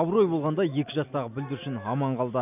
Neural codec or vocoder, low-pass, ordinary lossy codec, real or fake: none; 3.6 kHz; none; real